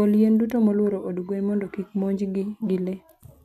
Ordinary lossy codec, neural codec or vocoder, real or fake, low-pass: none; none; real; 14.4 kHz